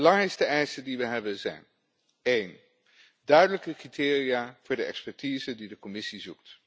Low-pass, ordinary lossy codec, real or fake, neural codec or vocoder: none; none; real; none